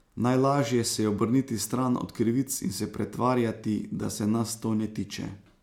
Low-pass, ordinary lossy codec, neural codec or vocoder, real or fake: 19.8 kHz; MP3, 96 kbps; none; real